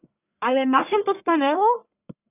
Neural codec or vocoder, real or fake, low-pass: codec, 44.1 kHz, 1.7 kbps, Pupu-Codec; fake; 3.6 kHz